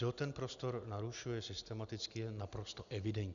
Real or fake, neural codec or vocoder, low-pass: real; none; 7.2 kHz